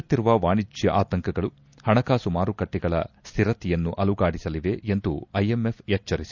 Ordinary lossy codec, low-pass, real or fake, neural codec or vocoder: Opus, 64 kbps; 7.2 kHz; real; none